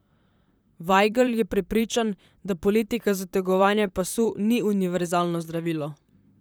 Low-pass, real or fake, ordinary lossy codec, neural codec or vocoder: none; fake; none; vocoder, 44.1 kHz, 128 mel bands, Pupu-Vocoder